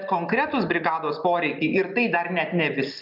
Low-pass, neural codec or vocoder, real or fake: 5.4 kHz; none; real